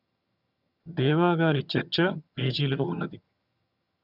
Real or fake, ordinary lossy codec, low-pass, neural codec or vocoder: fake; none; 5.4 kHz; vocoder, 22.05 kHz, 80 mel bands, HiFi-GAN